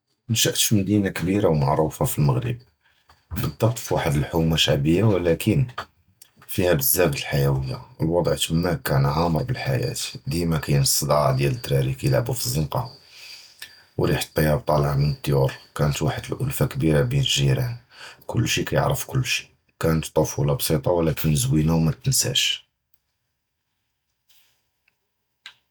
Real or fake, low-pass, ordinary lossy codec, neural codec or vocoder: real; none; none; none